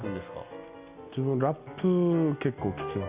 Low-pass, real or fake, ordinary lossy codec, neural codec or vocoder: 3.6 kHz; real; Opus, 64 kbps; none